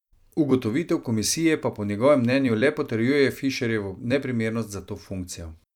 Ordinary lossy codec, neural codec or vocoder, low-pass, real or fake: none; vocoder, 44.1 kHz, 128 mel bands every 256 samples, BigVGAN v2; 19.8 kHz; fake